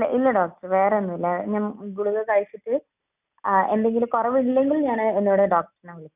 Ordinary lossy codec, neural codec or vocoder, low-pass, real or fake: none; none; 3.6 kHz; real